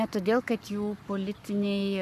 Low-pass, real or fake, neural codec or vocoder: 14.4 kHz; fake; codec, 44.1 kHz, 7.8 kbps, Pupu-Codec